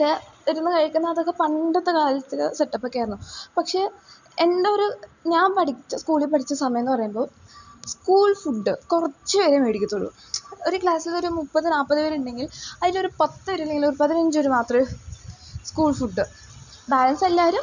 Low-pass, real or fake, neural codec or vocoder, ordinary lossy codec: 7.2 kHz; real; none; none